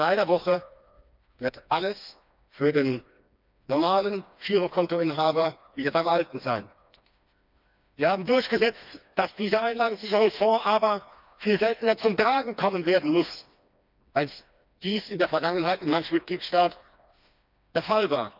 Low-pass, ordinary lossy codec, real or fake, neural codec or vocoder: 5.4 kHz; none; fake; codec, 16 kHz, 2 kbps, FreqCodec, smaller model